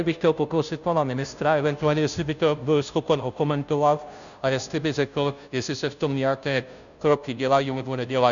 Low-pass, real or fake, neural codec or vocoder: 7.2 kHz; fake; codec, 16 kHz, 0.5 kbps, FunCodec, trained on Chinese and English, 25 frames a second